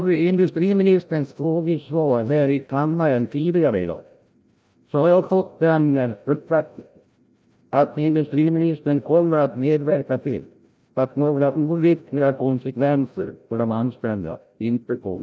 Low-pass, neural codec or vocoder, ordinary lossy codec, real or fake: none; codec, 16 kHz, 0.5 kbps, FreqCodec, larger model; none; fake